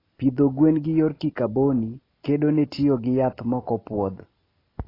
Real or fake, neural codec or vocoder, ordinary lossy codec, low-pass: real; none; AAC, 24 kbps; 5.4 kHz